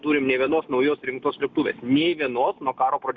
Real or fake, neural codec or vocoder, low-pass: real; none; 7.2 kHz